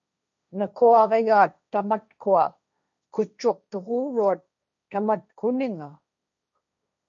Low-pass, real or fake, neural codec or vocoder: 7.2 kHz; fake; codec, 16 kHz, 1.1 kbps, Voila-Tokenizer